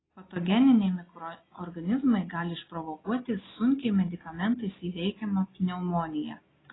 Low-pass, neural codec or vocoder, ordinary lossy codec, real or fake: 7.2 kHz; none; AAC, 16 kbps; real